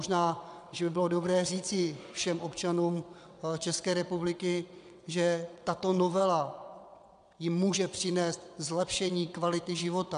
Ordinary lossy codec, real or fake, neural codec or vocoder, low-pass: MP3, 96 kbps; fake; vocoder, 22.05 kHz, 80 mel bands, WaveNeXt; 9.9 kHz